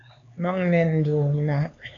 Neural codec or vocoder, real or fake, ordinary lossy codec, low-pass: codec, 16 kHz, 4 kbps, X-Codec, HuBERT features, trained on LibriSpeech; fake; AAC, 64 kbps; 7.2 kHz